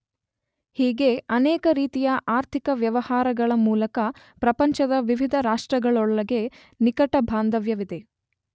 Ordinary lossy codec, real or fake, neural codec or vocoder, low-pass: none; real; none; none